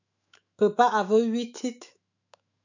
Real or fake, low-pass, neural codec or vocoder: fake; 7.2 kHz; autoencoder, 48 kHz, 128 numbers a frame, DAC-VAE, trained on Japanese speech